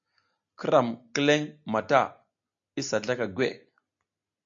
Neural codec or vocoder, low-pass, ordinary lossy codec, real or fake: none; 7.2 kHz; AAC, 64 kbps; real